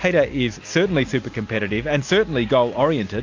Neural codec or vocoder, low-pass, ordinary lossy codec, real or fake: none; 7.2 kHz; AAC, 48 kbps; real